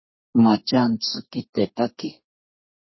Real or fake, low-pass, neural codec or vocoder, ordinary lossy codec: fake; 7.2 kHz; codec, 32 kHz, 1.9 kbps, SNAC; MP3, 24 kbps